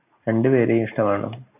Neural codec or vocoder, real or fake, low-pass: none; real; 3.6 kHz